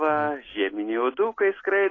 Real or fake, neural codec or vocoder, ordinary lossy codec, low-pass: real; none; AAC, 32 kbps; 7.2 kHz